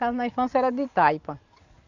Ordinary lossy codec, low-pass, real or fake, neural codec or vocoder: none; 7.2 kHz; real; none